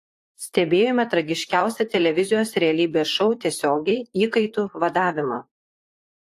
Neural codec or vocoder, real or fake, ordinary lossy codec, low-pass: vocoder, 44.1 kHz, 128 mel bands, Pupu-Vocoder; fake; AAC, 64 kbps; 14.4 kHz